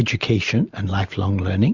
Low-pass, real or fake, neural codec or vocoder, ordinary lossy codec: 7.2 kHz; real; none; Opus, 64 kbps